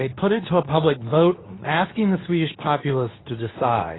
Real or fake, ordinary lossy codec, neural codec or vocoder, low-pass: fake; AAC, 16 kbps; codec, 24 kHz, 0.9 kbps, WavTokenizer, medium speech release version 2; 7.2 kHz